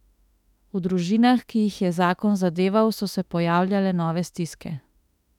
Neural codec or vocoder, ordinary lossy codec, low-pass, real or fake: autoencoder, 48 kHz, 32 numbers a frame, DAC-VAE, trained on Japanese speech; none; 19.8 kHz; fake